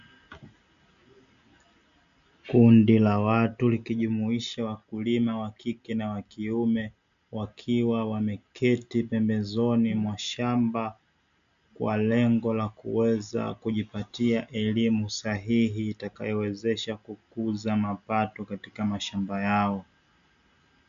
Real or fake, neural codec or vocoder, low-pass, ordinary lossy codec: real; none; 7.2 kHz; MP3, 64 kbps